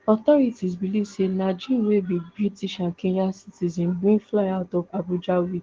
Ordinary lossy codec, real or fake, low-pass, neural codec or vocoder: Opus, 16 kbps; real; 7.2 kHz; none